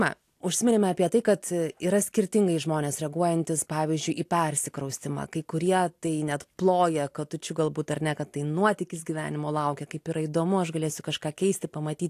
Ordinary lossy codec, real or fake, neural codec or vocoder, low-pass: AAC, 64 kbps; real; none; 14.4 kHz